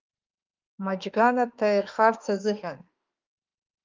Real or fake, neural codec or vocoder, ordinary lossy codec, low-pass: fake; autoencoder, 48 kHz, 32 numbers a frame, DAC-VAE, trained on Japanese speech; Opus, 32 kbps; 7.2 kHz